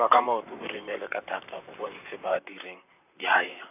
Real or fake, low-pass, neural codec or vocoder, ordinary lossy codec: fake; 3.6 kHz; vocoder, 44.1 kHz, 128 mel bands, Pupu-Vocoder; none